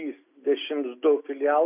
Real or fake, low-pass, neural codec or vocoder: real; 3.6 kHz; none